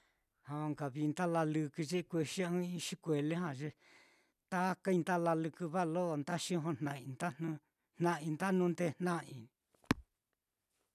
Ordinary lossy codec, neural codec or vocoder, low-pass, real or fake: none; none; 14.4 kHz; real